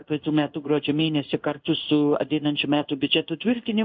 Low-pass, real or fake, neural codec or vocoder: 7.2 kHz; fake; codec, 16 kHz in and 24 kHz out, 1 kbps, XY-Tokenizer